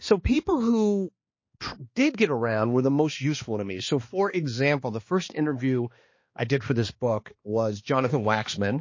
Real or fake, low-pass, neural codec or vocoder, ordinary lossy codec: fake; 7.2 kHz; codec, 16 kHz, 2 kbps, X-Codec, HuBERT features, trained on balanced general audio; MP3, 32 kbps